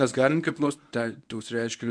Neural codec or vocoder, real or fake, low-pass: codec, 24 kHz, 0.9 kbps, WavTokenizer, medium speech release version 1; fake; 9.9 kHz